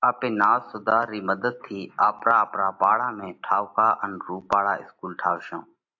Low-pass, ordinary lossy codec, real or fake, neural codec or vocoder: 7.2 kHz; AAC, 48 kbps; real; none